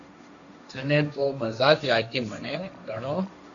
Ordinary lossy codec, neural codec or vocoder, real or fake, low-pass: MP3, 96 kbps; codec, 16 kHz, 1.1 kbps, Voila-Tokenizer; fake; 7.2 kHz